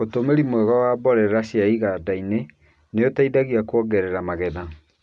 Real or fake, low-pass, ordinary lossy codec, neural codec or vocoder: real; 10.8 kHz; none; none